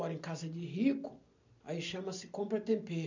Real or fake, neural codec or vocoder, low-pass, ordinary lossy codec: real; none; 7.2 kHz; none